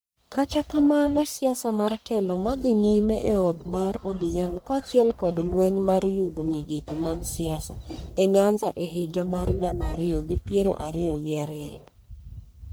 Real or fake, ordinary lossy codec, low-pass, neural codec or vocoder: fake; none; none; codec, 44.1 kHz, 1.7 kbps, Pupu-Codec